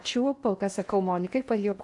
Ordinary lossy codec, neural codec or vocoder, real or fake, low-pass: AAC, 64 kbps; codec, 16 kHz in and 24 kHz out, 0.8 kbps, FocalCodec, streaming, 65536 codes; fake; 10.8 kHz